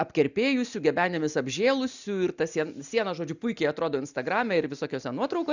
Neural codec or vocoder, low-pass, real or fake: none; 7.2 kHz; real